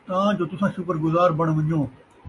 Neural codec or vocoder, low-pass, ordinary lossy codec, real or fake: none; 10.8 kHz; MP3, 48 kbps; real